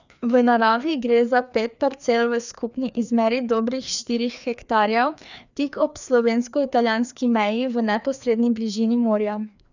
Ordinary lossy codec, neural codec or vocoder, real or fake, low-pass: none; codec, 16 kHz, 2 kbps, FreqCodec, larger model; fake; 7.2 kHz